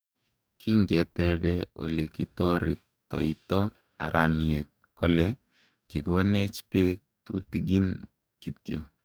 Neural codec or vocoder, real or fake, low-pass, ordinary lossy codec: codec, 44.1 kHz, 2.6 kbps, DAC; fake; none; none